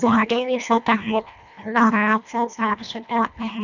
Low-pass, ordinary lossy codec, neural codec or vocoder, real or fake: 7.2 kHz; none; codec, 24 kHz, 1.5 kbps, HILCodec; fake